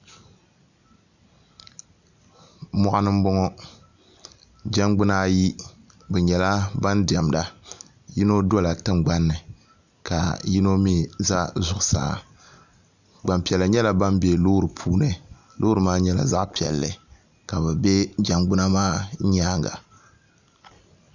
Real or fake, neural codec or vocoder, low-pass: real; none; 7.2 kHz